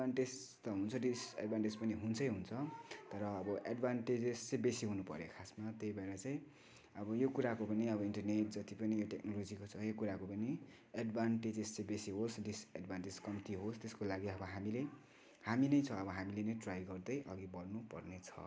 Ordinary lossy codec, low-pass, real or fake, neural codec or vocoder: none; none; real; none